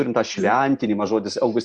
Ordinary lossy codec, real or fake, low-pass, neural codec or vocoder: Opus, 64 kbps; real; 9.9 kHz; none